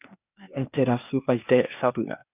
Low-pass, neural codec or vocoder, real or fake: 3.6 kHz; codec, 16 kHz, 0.8 kbps, ZipCodec; fake